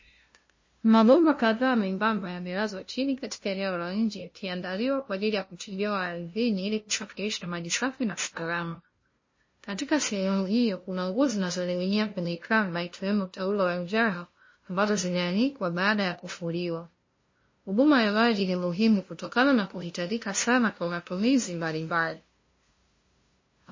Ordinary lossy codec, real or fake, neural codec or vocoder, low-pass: MP3, 32 kbps; fake; codec, 16 kHz, 0.5 kbps, FunCodec, trained on LibriTTS, 25 frames a second; 7.2 kHz